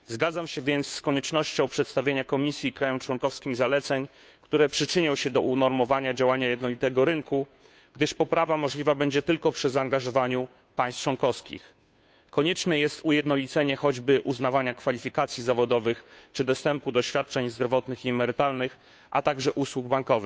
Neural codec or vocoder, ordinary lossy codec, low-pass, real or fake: codec, 16 kHz, 2 kbps, FunCodec, trained on Chinese and English, 25 frames a second; none; none; fake